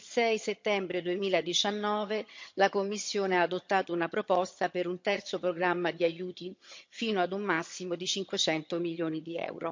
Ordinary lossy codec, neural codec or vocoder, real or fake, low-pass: MP3, 48 kbps; vocoder, 22.05 kHz, 80 mel bands, HiFi-GAN; fake; 7.2 kHz